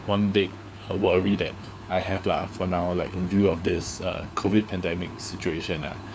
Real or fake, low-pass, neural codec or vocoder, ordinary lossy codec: fake; none; codec, 16 kHz, 4 kbps, FunCodec, trained on LibriTTS, 50 frames a second; none